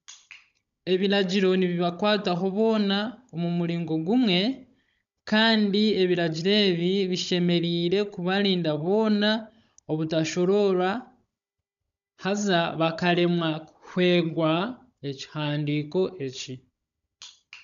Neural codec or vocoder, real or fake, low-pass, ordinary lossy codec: codec, 16 kHz, 16 kbps, FunCodec, trained on Chinese and English, 50 frames a second; fake; 7.2 kHz; none